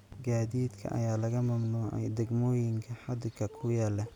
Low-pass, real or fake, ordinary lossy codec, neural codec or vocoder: 19.8 kHz; real; none; none